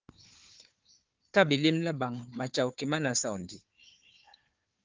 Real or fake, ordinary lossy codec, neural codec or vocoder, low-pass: fake; Opus, 24 kbps; codec, 16 kHz, 4 kbps, FunCodec, trained on Chinese and English, 50 frames a second; 7.2 kHz